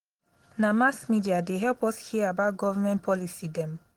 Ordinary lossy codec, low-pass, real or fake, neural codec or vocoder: Opus, 16 kbps; 19.8 kHz; real; none